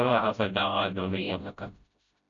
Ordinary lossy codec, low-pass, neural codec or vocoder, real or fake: MP3, 64 kbps; 7.2 kHz; codec, 16 kHz, 0.5 kbps, FreqCodec, smaller model; fake